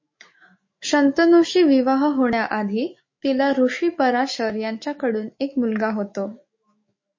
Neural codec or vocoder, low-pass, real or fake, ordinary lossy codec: autoencoder, 48 kHz, 128 numbers a frame, DAC-VAE, trained on Japanese speech; 7.2 kHz; fake; MP3, 32 kbps